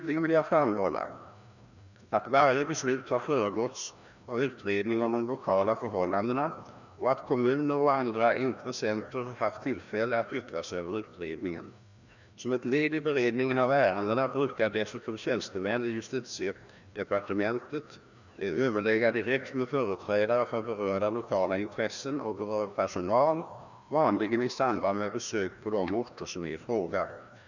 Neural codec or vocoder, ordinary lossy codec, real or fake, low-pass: codec, 16 kHz, 1 kbps, FreqCodec, larger model; none; fake; 7.2 kHz